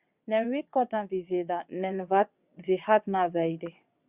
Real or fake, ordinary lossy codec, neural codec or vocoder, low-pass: fake; Opus, 64 kbps; vocoder, 22.05 kHz, 80 mel bands, WaveNeXt; 3.6 kHz